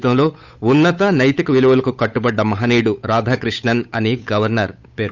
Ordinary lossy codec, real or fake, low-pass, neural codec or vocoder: none; fake; 7.2 kHz; codec, 16 kHz, 8 kbps, FunCodec, trained on Chinese and English, 25 frames a second